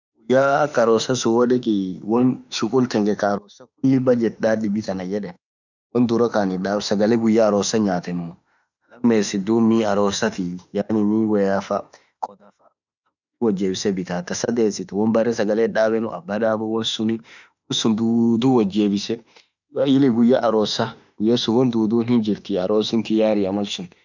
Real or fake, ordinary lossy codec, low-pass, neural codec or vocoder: fake; none; 7.2 kHz; autoencoder, 48 kHz, 32 numbers a frame, DAC-VAE, trained on Japanese speech